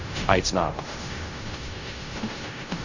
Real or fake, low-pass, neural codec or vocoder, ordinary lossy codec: fake; 7.2 kHz; codec, 16 kHz in and 24 kHz out, 0.4 kbps, LongCat-Audio-Codec, fine tuned four codebook decoder; none